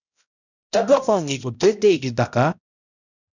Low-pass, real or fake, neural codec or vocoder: 7.2 kHz; fake; codec, 16 kHz, 0.5 kbps, X-Codec, HuBERT features, trained on balanced general audio